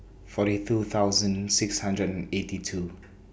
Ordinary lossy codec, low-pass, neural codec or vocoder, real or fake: none; none; none; real